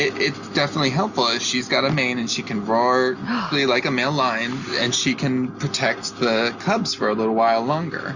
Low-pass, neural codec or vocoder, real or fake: 7.2 kHz; none; real